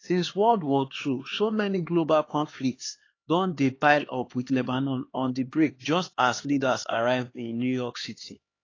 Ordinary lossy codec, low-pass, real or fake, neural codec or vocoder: AAC, 32 kbps; 7.2 kHz; fake; codec, 16 kHz, 2 kbps, X-Codec, HuBERT features, trained on LibriSpeech